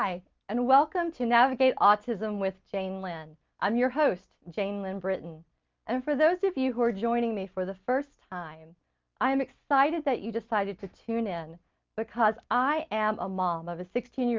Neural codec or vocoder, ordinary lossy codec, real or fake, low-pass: none; Opus, 24 kbps; real; 7.2 kHz